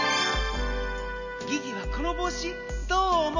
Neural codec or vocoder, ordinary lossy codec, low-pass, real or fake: none; none; 7.2 kHz; real